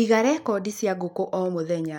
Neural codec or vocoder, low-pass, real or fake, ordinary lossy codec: none; 19.8 kHz; real; none